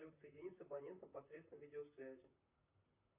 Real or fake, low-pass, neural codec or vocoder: fake; 3.6 kHz; vocoder, 44.1 kHz, 128 mel bands, Pupu-Vocoder